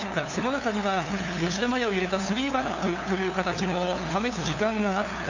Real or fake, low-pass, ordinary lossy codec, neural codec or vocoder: fake; 7.2 kHz; none; codec, 16 kHz, 2 kbps, FunCodec, trained on LibriTTS, 25 frames a second